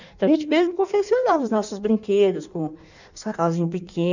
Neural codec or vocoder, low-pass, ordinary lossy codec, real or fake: codec, 16 kHz in and 24 kHz out, 1.1 kbps, FireRedTTS-2 codec; 7.2 kHz; none; fake